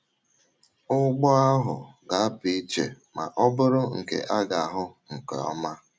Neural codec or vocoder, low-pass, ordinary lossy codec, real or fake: none; none; none; real